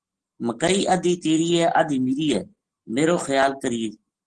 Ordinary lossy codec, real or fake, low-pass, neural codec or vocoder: Opus, 16 kbps; real; 10.8 kHz; none